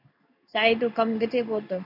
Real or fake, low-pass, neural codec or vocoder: fake; 5.4 kHz; codec, 16 kHz in and 24 kHz out, 1 kbps, XY-Tokenizer